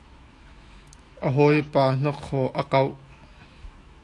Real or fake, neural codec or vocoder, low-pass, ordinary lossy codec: fake; autoencoder, 48 kHz, 128 numbers a frame, DAC-VAE, trained on Japanese speech; 10.8 kHz; AAC, 48 kbps